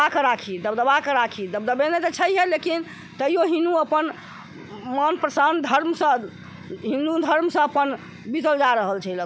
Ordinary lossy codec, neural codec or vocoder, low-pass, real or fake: none; none; none; real